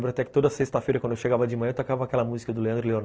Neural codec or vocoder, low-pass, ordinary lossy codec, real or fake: none; none; none; real